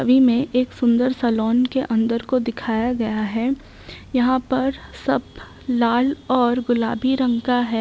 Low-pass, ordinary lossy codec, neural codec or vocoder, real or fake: none; none; none; real